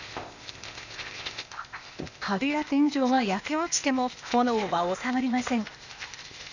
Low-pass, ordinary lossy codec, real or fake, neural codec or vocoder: 7.2 kHz; none; fake; codec, 16 kHz, 0.8 kbps, ZipCodec